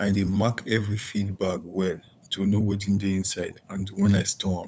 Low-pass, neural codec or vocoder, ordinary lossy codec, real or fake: none; codec, 16 kHz, 8 kbps, FunCodec, trained on LibriTTS, 25 frames a second; none; fake